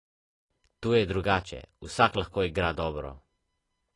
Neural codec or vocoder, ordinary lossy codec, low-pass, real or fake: none; AAC, 32 kbps; 10.8 kHz; real